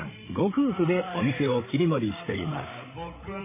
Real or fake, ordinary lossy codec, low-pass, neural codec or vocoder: fake; MP3, 16 kbps; 3.6 kHz; codec, 16 kHz, 8 kbps, FreqCodec, larger model